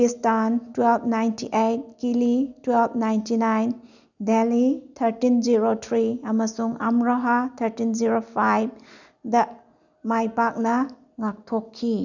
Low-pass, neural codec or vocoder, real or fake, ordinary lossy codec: 7.2 kHz; none; real; none